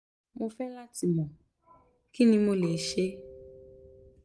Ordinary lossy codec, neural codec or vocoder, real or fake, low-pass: none; none; real; none